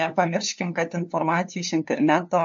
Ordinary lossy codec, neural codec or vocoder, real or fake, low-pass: MP3, 48 kbps; codec, 16 kHz, 2 kbps, FunCodec, trained on LibriTTS, 25 frames a second; fake; 7.2 kHz